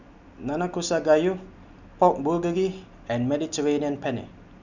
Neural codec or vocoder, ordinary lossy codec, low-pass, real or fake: none; none; 7.2 kHz; real